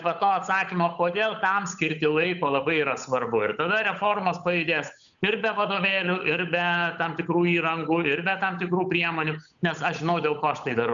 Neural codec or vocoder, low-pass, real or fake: codec, 16 kHz, 8 kbps, FunCodec, trained on Chinese and English, 25 frames a second; 7.2 kHz; fake